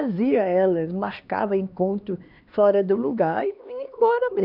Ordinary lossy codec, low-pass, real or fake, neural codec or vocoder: none; 5.4 kHz; fake; codec, 16 kHz, 2 kbps, X-Codec, HuBERT features, trained on LibriSpeech